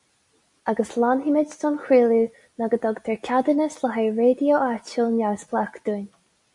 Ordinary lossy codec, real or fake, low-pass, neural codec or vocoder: MP3, 64 kbps; real; 10.8 kHz; none